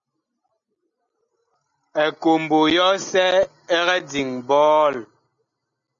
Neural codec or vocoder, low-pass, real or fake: none; 7.2 kHz; real